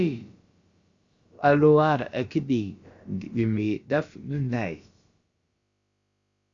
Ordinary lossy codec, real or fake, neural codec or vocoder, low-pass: Opus, 64 kbps; fake; codec, 16 kHz, about 1 kbps, DyCAST, with the encoder's durations; 7.2 kHz